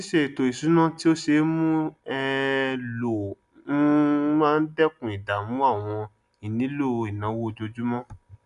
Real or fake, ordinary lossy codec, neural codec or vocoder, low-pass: real; none; none; 10.8 kHz